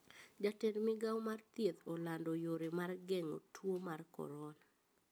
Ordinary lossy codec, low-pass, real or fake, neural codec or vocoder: none; none; real; none